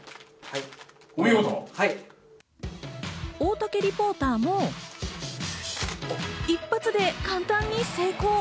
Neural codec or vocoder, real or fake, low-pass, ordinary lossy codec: none; real; none; none